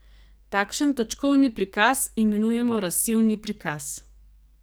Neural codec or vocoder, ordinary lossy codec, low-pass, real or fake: codec, 44.1 kHz, 2.6 kbps, SNAC; none; none; fake